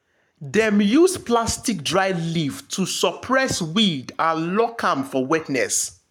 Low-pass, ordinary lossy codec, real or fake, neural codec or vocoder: 19.8 kHz; none; fake; codec, 44.1 kHz, 7.8 kbps, Pupu-Codec